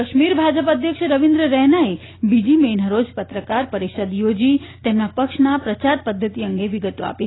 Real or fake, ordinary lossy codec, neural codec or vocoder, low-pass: real; AAC, 16 kbps; none; 7.2 kHz